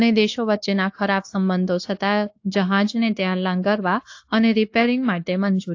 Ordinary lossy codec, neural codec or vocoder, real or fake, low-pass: AAC, 48 kbps; codec, 16 kHz, 0.9 kbps, LongCat-Audio-Codec; fake; 7.2 kHz